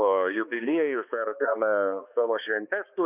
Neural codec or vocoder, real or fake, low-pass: codec, 16 kHz, 2 kbps, X-Codec, HuBERT features, trained on balanced general audio; fake; 3.6 kHz